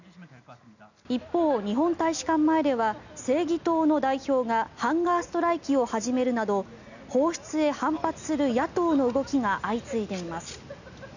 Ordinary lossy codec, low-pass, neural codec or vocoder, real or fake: none; 7.2 kHz; none; real